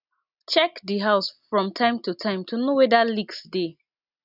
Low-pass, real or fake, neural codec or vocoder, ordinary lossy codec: 5.4 kHz; real; none; none